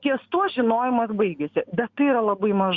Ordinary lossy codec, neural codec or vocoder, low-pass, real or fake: Opus, 64 kbps; none; 7.2 kHz; real